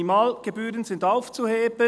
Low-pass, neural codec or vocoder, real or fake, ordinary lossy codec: none; none; real; none